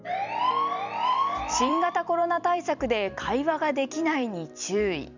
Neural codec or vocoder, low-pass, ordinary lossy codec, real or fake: vocoder, 44.1 kHz, 128 mel bands every 256 samples, BigVGAN v2; 7.2 kHz; none; fake